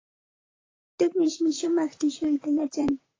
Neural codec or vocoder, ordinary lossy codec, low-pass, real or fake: vocoder, 44.1 kHz, 128 mel bands, Pupu-Vocoder; AAC, 32 kbps; 7.2 kHz; fake